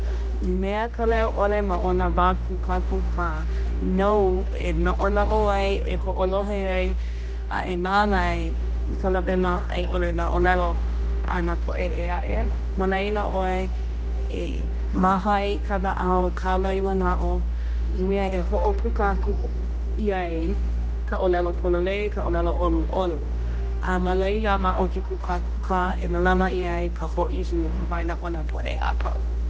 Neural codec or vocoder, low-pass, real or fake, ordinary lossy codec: codec, 16 kHz, 1 kbps, X-Codec, HuBERT features, trained on general audio; none; fake; none